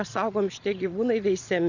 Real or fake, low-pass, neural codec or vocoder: fake; 7.2 kHz; vocoder, 22.05 kHz, 80 mel bands, Vocos